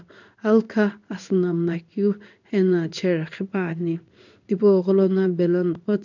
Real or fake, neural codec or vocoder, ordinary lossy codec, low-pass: fake; codec, 16 kHz in and 24 kHz out, 1 kbps, XY-Tokenizer; none; 7.2 kHz